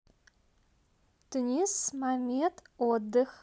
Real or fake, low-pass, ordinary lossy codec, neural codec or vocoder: real; none; none; none